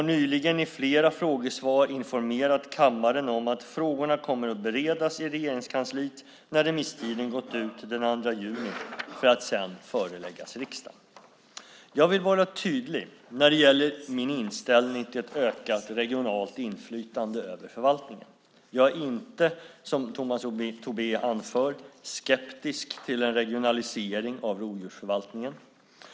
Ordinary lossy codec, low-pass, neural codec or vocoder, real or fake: none; none; none; real